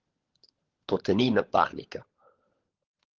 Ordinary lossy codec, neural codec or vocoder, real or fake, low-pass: Opus, 16 kbps; codec, 16 kHz, 16 kbps, FunCodec, trained on LibriTTS, 50 frames a second; fake; 7.2 kHz